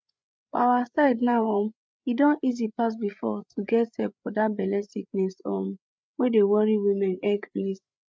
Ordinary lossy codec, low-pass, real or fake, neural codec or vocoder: none; none; fake; codec, 16 kHz, 8 kbps, FreqCodec, larger model